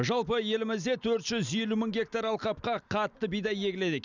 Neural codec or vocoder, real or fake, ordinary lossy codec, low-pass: none; real; none; 7.2 kHz